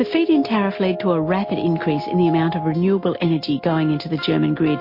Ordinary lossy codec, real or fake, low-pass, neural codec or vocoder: MP3, 32 kbps; real; 5.4 kHz; none